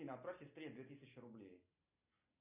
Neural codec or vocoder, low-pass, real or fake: none; 3.6 kHz; real